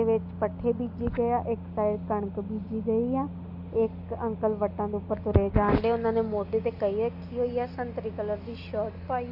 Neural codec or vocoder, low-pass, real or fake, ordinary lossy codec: none; 5.4 kHz; real; none